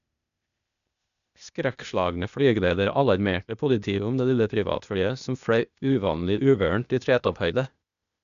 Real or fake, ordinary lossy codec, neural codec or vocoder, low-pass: fake; none; codec, 16 kHz, 0.8 kbps, ZipCodec; 7.2 kHz